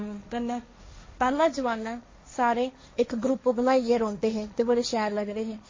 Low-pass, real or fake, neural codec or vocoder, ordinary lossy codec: 7.2 kHz; fake; codec, 16 kHz, 1.1 kbps, Voila-Tokenizer; MP3, 32 kbps